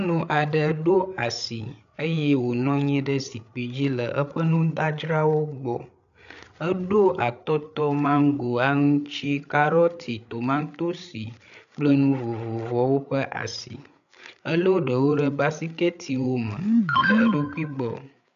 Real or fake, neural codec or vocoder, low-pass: fake; codec, 16 kHz, 8 kbps, FreqCodec, larger model; 7.2 kHz